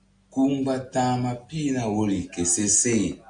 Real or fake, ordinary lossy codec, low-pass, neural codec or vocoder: real; AAC, 64 kbps; 9.9 kHz; none